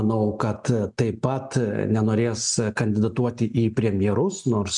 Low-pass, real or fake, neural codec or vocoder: 10.8 kHz; real; none